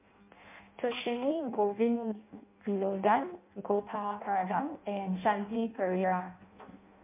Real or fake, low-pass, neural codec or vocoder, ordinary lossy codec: fake; 3.6 kHz; codec, 16 kHz in and 24 kHz out, 0.6 kbps, FireRedTTS-2 codec; MP3, 32 kbps